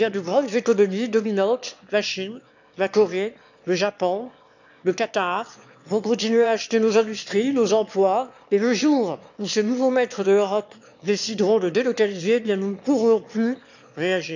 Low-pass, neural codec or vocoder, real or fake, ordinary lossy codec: 7.2 kHz; autoencoder, 22.05 kHz, a latent of 192 numbers a frame, VITS, trained on one speaker; fake; none